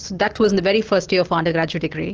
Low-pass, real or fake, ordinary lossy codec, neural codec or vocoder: 7.2 kHz; real; Opus, 16 kbps; none